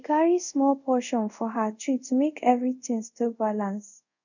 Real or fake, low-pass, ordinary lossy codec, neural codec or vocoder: fake; 7.2 kHz; none; codec, 24 kHz, 0.5 kbps, DualCodec